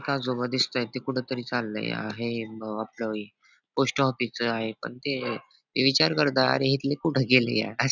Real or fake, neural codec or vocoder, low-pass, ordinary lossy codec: real; none; 7.2 kHz; none